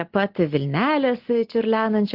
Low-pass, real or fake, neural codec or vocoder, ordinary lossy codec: 5.4 kHz; real; none; Opus, 16 kbps